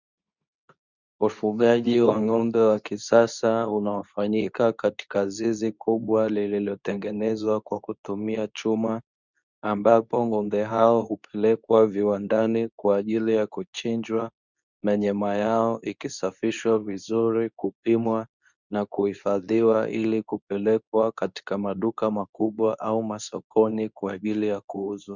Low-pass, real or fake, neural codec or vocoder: 7.2 kHz; fake; codec, 24 kHz, 0.9 kbps, WavTokenizer, medium speech release version 2